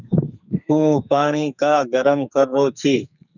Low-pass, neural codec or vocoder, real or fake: 7.2 kHz; codec, 44.1 kHz, 2.6 kbps, SNAC; fake